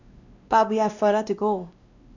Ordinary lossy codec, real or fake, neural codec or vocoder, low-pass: Opus, 64 kbps; fake; codec, 16 kHz, 1 kbps, X-Codec, WavLM features, trained on Multilingual LibriSpeech; 7.2 kHz